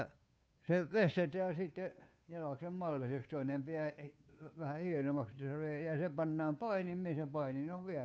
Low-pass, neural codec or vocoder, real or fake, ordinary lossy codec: none; codec, 16 kHz, 2 kbps, FunCodec, trained on Chinese and English, 25 frames a second; fake; none